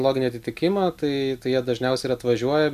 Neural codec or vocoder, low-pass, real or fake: none; 14.4 kHz; real